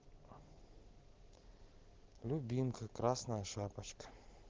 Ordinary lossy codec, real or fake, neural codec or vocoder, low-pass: Opus, 16 kbps; real; none; 7.2 kHz